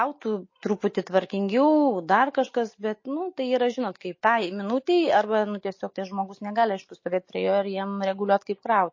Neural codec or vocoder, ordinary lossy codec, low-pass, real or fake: none; MP3, 32 kbps; 7.2 kHz; real